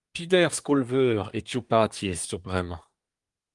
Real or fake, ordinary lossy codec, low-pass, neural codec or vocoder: fake; Opus, 32 kbps; 10.8 kHz; codec, 24 kHz, 1 kbps, SNAC